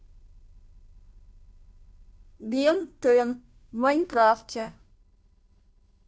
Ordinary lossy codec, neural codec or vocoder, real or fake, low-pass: none; codec, 16 kHz, 0.5 kbps, FunCodec, trained on Chinese and English, 25 frames a second; fake; none